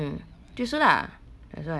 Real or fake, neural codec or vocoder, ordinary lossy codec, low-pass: real; none; none; none